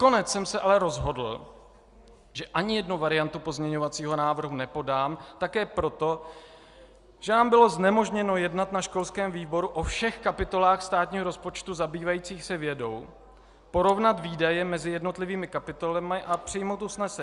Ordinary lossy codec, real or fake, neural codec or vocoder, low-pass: Opus, 64 kbps; real; none; 10.8 kHz